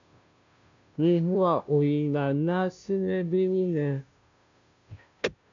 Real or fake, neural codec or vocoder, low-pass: fake; codec, 16 kHz, 0.5 kbps, FunCodec, trained on Chinese and English, 25 frames a second; 7.2 kHz